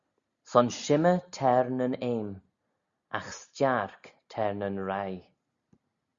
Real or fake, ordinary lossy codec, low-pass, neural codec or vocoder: real; Opus, 64 kbps; 7.2 kHz; none